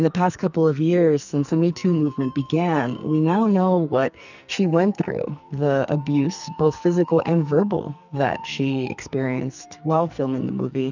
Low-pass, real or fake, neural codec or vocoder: 7.2 kHz; fake; codec, 44.1 kHz, 2.6 kbps, SNAC